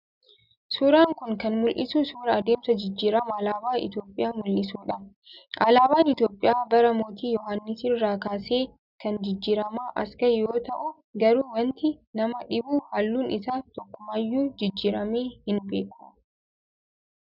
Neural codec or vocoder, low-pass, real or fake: none; 5.4 kHz; real